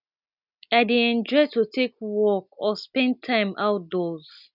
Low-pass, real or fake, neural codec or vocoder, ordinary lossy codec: 5.4 kHz; real; none; none